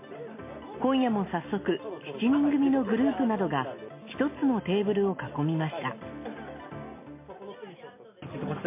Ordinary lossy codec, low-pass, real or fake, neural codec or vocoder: MP3, 24 kbps; 3.6 kHz; real; none